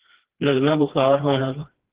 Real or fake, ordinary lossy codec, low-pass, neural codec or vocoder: fake; Opus, 16 kbps; 3.6 kHz; codec, 16 kHz, 2 kbps, FreqCodec, smaller model